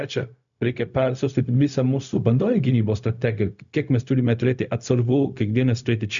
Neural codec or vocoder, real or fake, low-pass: codec, 16 kHz, 0.4 kbps, LongCat-Audio-Codec; fake; 7.2 kHz